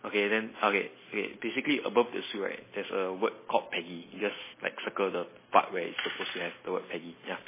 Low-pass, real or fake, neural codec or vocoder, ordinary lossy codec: 3.6 kHz; real; none; MP3, 16 kbps